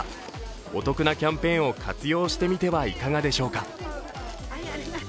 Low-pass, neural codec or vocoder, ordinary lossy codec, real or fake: none; none; none; real